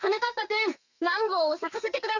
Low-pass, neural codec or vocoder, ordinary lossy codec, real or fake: 7.2 kHz; codec, 32 kHz, 1.9 kbps, SNAC; none; fake